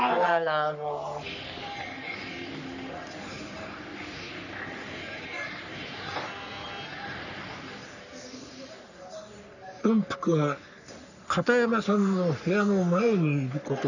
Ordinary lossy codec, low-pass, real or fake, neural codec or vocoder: none; 7.2 kHz; fake; codec, 44.1 kHz, 3.4 kbps, Pupu-Codec